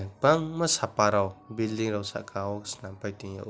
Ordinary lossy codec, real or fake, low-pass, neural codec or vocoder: none; real; none; none